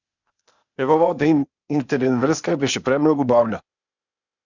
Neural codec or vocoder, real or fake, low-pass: codec, 16 kHz, 0.8 kbps, ZipCodec; fake; 7.2 kHz